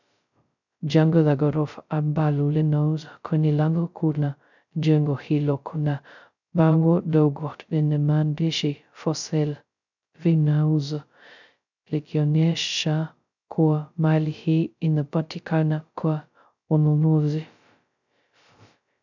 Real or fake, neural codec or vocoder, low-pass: fake; codec, 16 kHz, 0.2 kbps, FocalCodec; 7.2 kHz